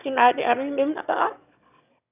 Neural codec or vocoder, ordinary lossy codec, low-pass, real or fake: autoencoder, 22.05 kHz, a latent of 192 numbers a frame, VITS, trained on one speaker; none; 3.6 kHz; fake